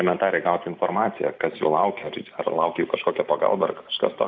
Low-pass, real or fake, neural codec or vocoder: 7.2 kHz; real; none